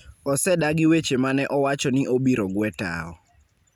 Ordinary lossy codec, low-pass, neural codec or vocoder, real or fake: none; 19.8 kHz; none; real